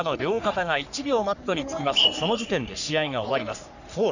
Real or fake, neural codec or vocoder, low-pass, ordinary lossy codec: fake; codec, 44.1 kHz, 3.4 kbps, Pupu-Codec; 7.2 kHz; none